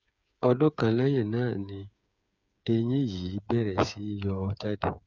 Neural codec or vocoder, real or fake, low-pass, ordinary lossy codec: codec, 16 kHz, 8 kbps, FreqCodec, smaller model; fake; 7.2 kHz; none